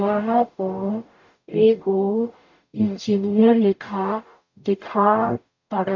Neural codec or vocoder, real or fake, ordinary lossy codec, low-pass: codec, 44.1 kHz, 0.9 kbps, DAC; fake; MP3, 64 kbps; 7.2 kHz